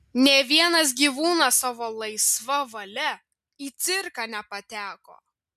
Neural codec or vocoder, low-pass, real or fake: none; 14.4 kHz; real